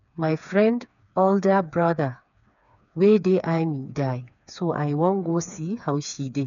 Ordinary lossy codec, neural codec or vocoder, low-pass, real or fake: none; codec, 16 kHz, 4 kbps, FreqCodec, smaller model; 7.2 kHz; fake